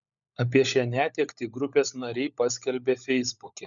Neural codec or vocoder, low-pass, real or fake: codec, 16 kHz, 16 kbps, FunCodec, trained on LibriTTS, 50 frames a second; 7.2 kHz; fake